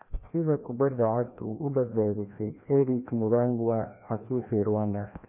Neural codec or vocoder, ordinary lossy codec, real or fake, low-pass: codec, 16 kHz, 1 kbps, FreqCodec, larger model; none; fake; 3.6 kHz